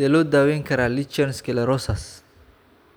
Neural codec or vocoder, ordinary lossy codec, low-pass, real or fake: none; none; none; real